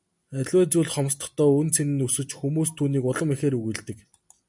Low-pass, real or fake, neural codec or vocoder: 10.8 kHz; real; none